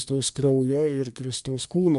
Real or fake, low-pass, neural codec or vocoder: fake; 10.8 kHz; codec, 24 kHz, 1 kbps, SNAC